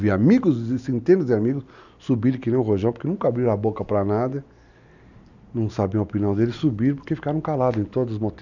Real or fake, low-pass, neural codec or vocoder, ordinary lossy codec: real; 7.2 kHz; none; none